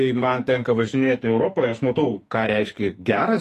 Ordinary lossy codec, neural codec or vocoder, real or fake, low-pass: AAC, 48 kbps; codec, 44.1 kHz, 2.6 kbps, SNAC; fake; 14.4 kHz